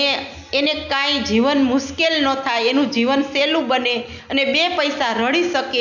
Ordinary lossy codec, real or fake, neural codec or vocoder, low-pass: none; real; none; 7.2 kHz